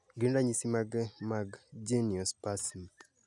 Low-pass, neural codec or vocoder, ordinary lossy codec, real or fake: 10.8 kHz; none; none; real